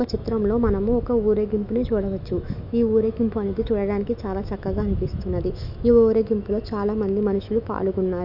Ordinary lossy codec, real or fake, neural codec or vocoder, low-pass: none; fake; autoencoder, 48 kHz, 128 numbers a frame, DAC-VAE, trained on Japanese speech; 5.4 kHz